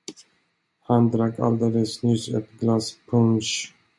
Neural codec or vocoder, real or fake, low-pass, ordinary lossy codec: none; real; 10.8 kHz; MP3, 96 kbps